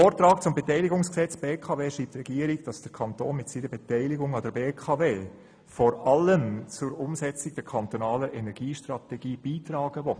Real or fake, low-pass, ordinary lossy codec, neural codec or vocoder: real; 9.9 kHz; none; none